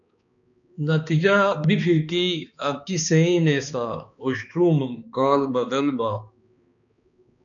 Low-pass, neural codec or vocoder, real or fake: 7.2 kHz; codec, 16 kHz, 2 kbps, X-Codec, HuBERT features, trained on balanced general audio; fake